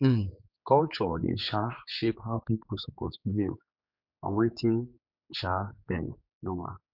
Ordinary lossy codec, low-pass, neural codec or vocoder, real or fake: none; 5.4 kHz; codec, 16 kHz, 4 kbps, X-Codec, HuBERT features, trained on general audio; fake